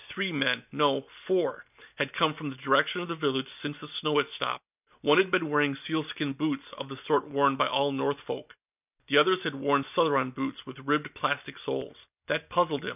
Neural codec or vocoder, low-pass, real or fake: none; 3.6 kHz; real